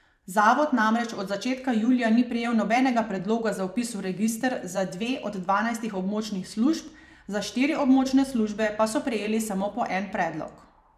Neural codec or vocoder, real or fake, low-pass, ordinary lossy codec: vocoder, 44.1 kHz, 128 mel bands every 512 samples, BigVGAN v2; fake; 14.4 kHz; none